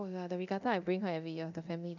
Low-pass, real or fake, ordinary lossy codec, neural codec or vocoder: 7.2 kHz; fake; none; codec, 24 kHz, 0.9 kbps, DualCodec